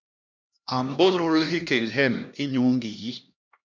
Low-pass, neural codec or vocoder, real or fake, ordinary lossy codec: 7.2 kHz; codec, 16 kHz, 1 kbps, X-Codec, HuBERT features, trained on LibriSpeech; fake; MP3, 64 kbps